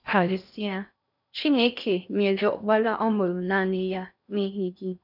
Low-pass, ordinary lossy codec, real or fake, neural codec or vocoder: 5.4 kHz; none; fake; codec, 16 kHz in and 24 kHz out, 0.6 kbps, FocalCodec, streaming, 4096 codes